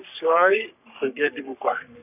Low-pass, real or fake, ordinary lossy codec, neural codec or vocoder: 3.6 kHz; fake; none; codec, 44.1 kHz, 3.4 kbps, Pupu-Codec